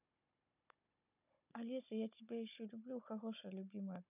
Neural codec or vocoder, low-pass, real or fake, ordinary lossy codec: codec, 16 kHz, 16 kbps, FunCodec, trained on Chinese and English, 50 frames a second; 3.6 kHz; fake; none